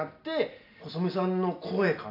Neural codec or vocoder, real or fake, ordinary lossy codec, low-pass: none; real; AAC, 32 kbps; 5.4 kHz